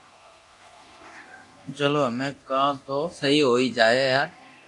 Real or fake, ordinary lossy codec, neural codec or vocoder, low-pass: fake; AAC, 64 kbps; codec, 24 kHz, 0.9 kbps, DualCodec; 10.8 kHz